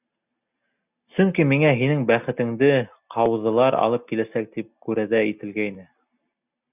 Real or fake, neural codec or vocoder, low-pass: real; none; 3.6 kHz